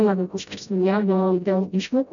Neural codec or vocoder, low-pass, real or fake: codec, 16 kHz, 0.5 kbps, FreqCodec, smaller model; 7.2 kHz; fake